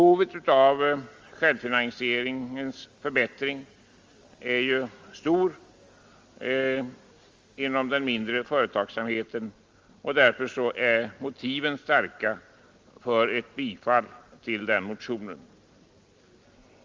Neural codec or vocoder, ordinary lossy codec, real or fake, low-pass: none; Opus, 32 kbps; real; 7.2 kHz